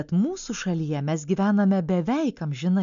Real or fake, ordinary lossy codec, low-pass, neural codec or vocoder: real; MP3, 96 kbps; 7.2 kHz; none